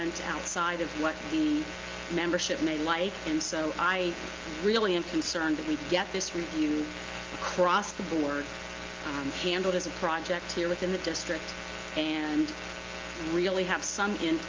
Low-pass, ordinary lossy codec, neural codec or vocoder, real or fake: 7.2 kHz; Opus, 32 kbps; none; real